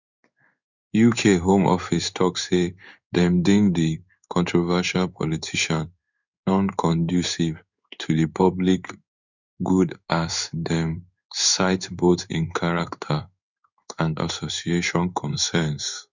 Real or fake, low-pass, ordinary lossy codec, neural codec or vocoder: fake; 7.2 kHz; none; codec, 16 kHz in and 24 kHz out, 1 kbps, XY-Tokenizer